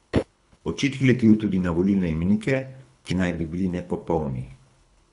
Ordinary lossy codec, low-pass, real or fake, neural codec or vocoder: none; 10.8 kHz; fake; codec, 24 kHz, 3 kbps, HILCodec